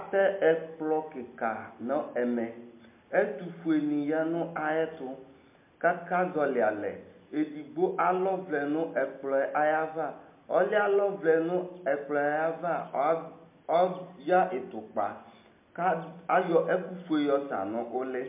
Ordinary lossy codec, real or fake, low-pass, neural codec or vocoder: MP3, 32 kbps; real; 3.6 kHz; none